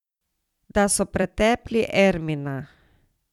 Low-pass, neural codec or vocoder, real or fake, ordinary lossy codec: 19.8 kHz; vocoder, 44.1 kHz, 128 mel bands every 256 samples, BigVGAN v2; fake; none